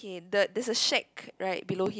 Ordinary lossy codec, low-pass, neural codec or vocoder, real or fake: none; none; none; real